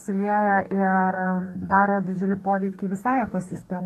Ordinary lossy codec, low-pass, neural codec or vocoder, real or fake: AAC, 64 kbps; 14.4 kHz; codec, 44.1 kHz, 2.6 kbps, DAC; fake